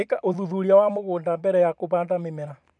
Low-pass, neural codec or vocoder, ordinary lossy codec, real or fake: 10.8 kHz; vocoder, 44.1 kHz, 128 mel bands every 256 samples, BigVGAN v2; none; fake